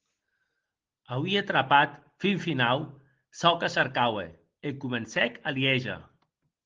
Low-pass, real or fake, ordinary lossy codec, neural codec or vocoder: 7.2 kHz; real; Opus, 16 kbps; none